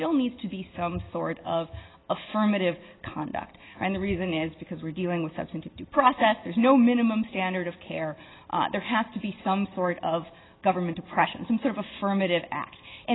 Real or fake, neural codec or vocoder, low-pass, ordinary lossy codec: real; none; 7.2 kHz; AAC, 16 kbps